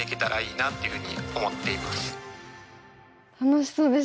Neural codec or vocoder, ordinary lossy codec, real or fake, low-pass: none; none; real; none